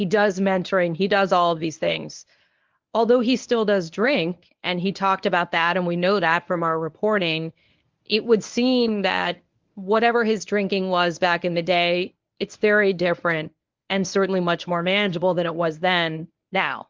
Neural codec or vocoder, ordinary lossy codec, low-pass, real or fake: codec, 24 kHz, 0.9 kbps, WavTokenizer, medium speech release version 1; Opus, 24 kbps; 7.2 kHz; fake